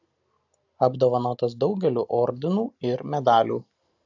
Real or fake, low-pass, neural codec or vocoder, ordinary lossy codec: real; 7.2 kHz; none; AAC, 48 kbps